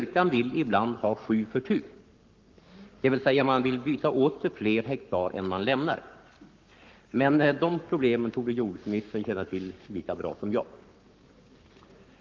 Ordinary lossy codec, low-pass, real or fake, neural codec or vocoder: Opus, 24 kbps; 7.2 kHz; fake; codec, 44.1 kHz, 7.8 kbps, Pupu-Codec